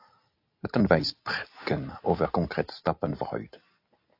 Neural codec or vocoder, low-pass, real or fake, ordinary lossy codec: none; 5.4 kHz; real; AAC, 32 kbps